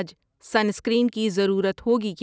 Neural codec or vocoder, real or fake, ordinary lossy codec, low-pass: none; real; none; none